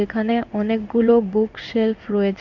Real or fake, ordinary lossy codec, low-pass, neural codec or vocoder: fake; Opus, 64 kbps; 7.2 kHz; codec, 16 kHz in and 24 kHz out, 1 kbps, XY-Tokenizer